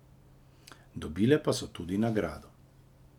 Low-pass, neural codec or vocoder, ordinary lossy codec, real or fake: 19.8 kHz; none; none; real